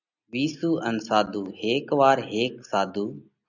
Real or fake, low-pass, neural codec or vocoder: real; 7.2 kHz; none